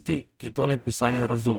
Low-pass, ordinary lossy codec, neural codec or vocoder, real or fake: none; none; codec, 44.1 kHz, 0.9 kbps, DAC; fake